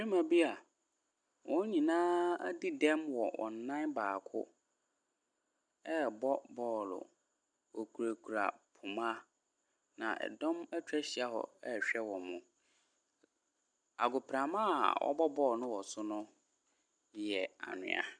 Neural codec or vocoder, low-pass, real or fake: none; 9.9 kHz; real